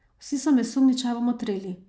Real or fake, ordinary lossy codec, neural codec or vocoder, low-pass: real; none; none; none